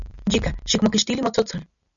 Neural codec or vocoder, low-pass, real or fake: none; 7.2 kHz; real